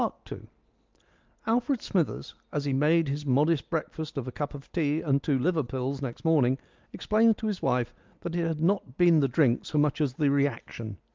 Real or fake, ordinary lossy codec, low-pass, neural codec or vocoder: real; Opus, 32 kbps; 7.2 kHz; none